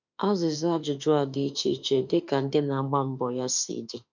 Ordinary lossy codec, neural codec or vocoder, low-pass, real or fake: none; autoencoder, 48 kHz, 32 numbers a frame, DAC-VAE, trained on Japanese speech; 7.2 kHz; fake